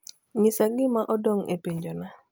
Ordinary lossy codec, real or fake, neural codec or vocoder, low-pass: none; real; none; none